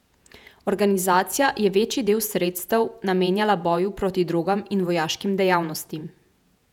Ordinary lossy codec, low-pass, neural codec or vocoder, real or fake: none; 19.8 kHz; vocoder, 48 kHz, 128 mel bands, Vocos; fake